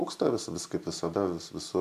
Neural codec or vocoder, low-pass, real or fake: none; 14.4 kHz; real